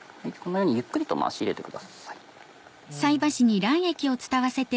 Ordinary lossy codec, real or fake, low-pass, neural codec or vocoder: none; real; none; none